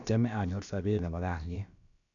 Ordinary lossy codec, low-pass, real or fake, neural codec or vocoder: none; 7.2 kHz; fake; codec, 16 kHz, about 1 kbps, DyCAST, with the encoder's durations